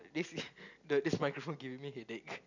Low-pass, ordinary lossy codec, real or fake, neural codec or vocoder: 7.2 kHz; none; real; none